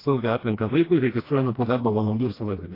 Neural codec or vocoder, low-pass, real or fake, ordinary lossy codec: codec, 16 kHz, 1 kbps, FreqCodec, smaller model; 5.4 kHz; fake; AAC, 24 kbps